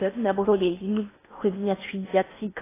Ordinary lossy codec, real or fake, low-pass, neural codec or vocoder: AAC, 16 kbps; fake; 3.6 kHz; codec, 16 kHz in and 24 kHz out, 0.8 kbps, FocalCodec, streaming, 65536 codes